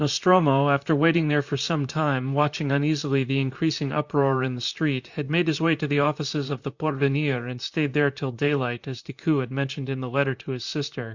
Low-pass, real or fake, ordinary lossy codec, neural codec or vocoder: 7.2 kHz; fake; Opus, 64 kbps; vocoder, 44.1 kHz, 128 mel bands, Pupu-Vocoder